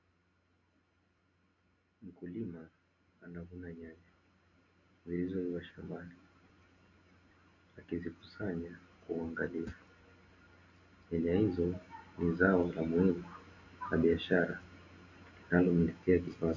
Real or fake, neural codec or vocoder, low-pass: real; none; 7.2 kHz